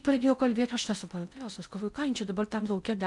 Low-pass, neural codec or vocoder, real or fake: 10.8 kHz; codec, 16 kHz in and 24 kHz out, 0.8 kbps, FocalCodec, streaming, 65536 codes; fake